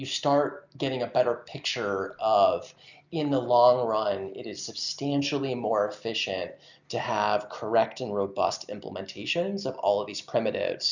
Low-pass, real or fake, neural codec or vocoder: 7.2 kHz; real; none